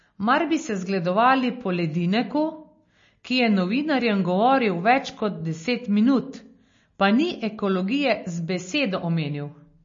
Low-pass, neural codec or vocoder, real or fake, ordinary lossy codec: 7.2 kHz; none; real; MP3, 32 kbps